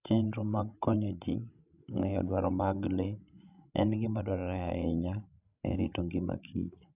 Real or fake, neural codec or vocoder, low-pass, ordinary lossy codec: fake; codec, 16 kHz, 16 kbps, FreqCodec, larger model; 3.6 kHz; none